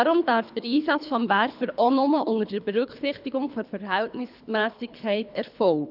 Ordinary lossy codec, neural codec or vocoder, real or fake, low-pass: none; codec, 24 kHz, 3 kbps, HILCodec; fake; 5.4 kHz